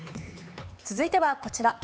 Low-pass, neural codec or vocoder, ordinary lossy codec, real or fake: none; codec, 16 kHz, 4 kbps, X-Codec, HuBERT features, trained on LibriSpeech; none; fake